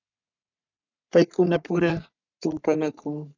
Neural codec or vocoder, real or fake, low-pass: codec, 44.1 kHz, 3.4 kbps, Pupu-Codec; fake; 7.2 kHz